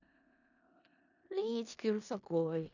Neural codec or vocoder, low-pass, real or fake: codec, 16 kHz in and 24 kHz out, 0.4 kbps, LongCat-Audio-Codec, four codebook decoder; 7.2 kHz; fake